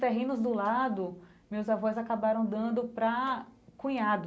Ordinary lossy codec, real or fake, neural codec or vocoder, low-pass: none; real; none; none